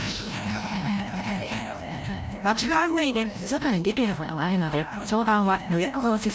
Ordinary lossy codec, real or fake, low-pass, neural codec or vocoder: none; fake; none; codec, 16 kHz, 0.5 kbps, FreqCodec, larger model